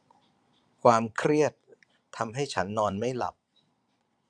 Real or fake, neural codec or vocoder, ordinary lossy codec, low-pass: fake; vocoder, 22.05 kHz, 80 mel bands, Vocos; none; 9.9 kHz